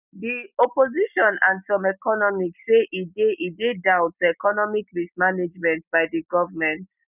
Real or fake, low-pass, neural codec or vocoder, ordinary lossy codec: real; 3.6 kHz; none; none